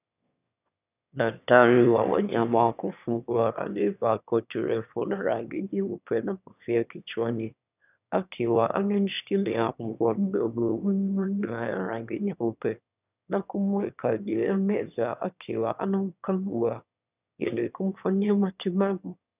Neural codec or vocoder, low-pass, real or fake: autoencoder, 22.05 kHz, a latent of 192 numbers a frame, VITS, trained on one speaker; 3.6 kHz; fake